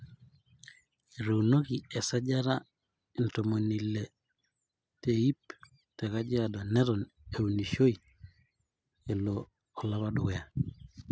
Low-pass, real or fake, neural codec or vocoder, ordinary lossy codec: none; real; none; none